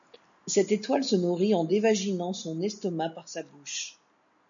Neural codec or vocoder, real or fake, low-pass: none; real; 7.2 kHz